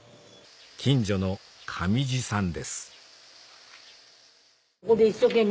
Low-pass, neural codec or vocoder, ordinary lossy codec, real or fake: none; none; none; real